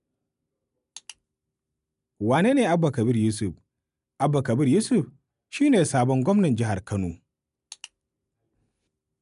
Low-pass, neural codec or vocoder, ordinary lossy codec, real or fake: 10.8 kHz; none; none; real